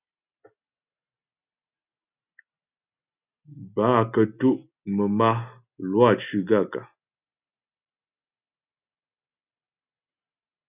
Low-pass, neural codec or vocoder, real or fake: 3.6 kHz; none; real